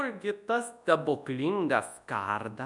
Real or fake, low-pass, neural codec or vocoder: fake; 10.8 kHz; codec, 24 kHz, 0.9 kbps, WavTokenizer, large speech release